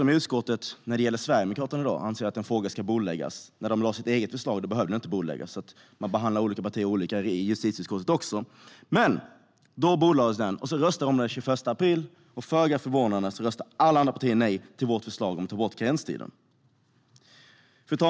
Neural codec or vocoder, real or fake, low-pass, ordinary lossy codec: none; real; none; none